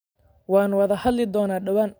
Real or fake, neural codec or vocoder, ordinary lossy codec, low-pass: real; none; none; none